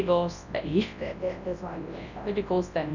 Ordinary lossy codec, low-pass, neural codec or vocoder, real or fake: none; 7.2 kHz; codec, 24 kHz, 0.9 kbps, WavTokenizer, large speech release; fake